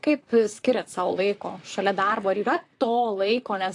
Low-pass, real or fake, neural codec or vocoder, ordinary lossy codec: 10.8 kHz; fake; vocoder, 44.1 kHz, 128 mel bands, Pupu-Vocoder; AAC, 48 kbps